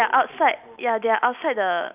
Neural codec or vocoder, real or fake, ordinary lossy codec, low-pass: none; real; none; 3.6 kHz